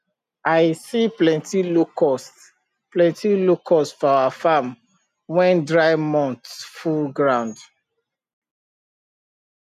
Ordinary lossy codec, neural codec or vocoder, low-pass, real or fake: none; none; 14.4 kHz; real